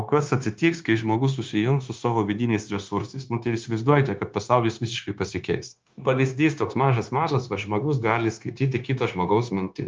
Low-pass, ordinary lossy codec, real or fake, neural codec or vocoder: 7.2 kHz; Opus, 24 kbps; fake; codec, 16 kHz, 0.9 kbps, LongCat-Audio-Codec